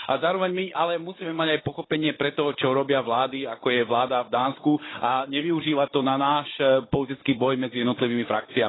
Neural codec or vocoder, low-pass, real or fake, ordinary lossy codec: codec, 16 kHz, 16 kbps, FunCodec, trained on Chinese and English, 50 frames a second; 7.2 kHz; fake; AAC, 16 kbps